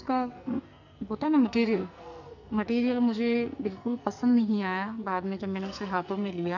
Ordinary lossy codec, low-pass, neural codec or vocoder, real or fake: none; 7.2 kHz; codec, 44.1 kHz, 2.6 kbps, SNAC; fake